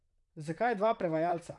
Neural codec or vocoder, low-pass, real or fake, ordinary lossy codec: vocoder, 44.1 kHz, 128 mel bands, Pupu-Vocoder; 10.8 kHz; fake; none